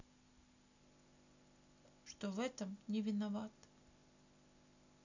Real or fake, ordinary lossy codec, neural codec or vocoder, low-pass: real; none; none; 7.2 kHz